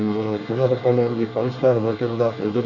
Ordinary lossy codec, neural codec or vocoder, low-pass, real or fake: none; codec, 24 kHz, 1 kbps, SNAC; 7.2 kHz; fake